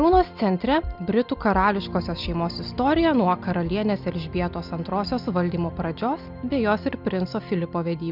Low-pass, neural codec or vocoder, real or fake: 5.4 kHz; none; real